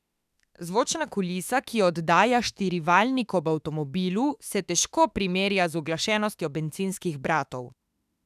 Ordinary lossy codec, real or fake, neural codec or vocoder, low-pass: none; fake; autoencoder, 48 kHz, 32 numbers a frame, DAC-VAE, trained on Japanese speech; 14.4 kHz